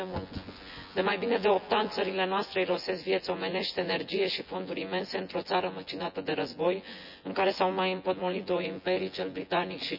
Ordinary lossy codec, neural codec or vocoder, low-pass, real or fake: none; vocoder, 24 kHz, 100 mel bands, Vocos; 5.4 kHz; fake